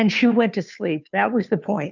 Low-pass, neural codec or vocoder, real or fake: 7.2 kHz; codec, 16 kHz, 2 kbps, FunCodec, trained on Chinese and English, 25 frames a second; fake